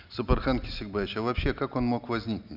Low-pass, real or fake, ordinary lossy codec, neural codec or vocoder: 5.4 kHz; real; none; none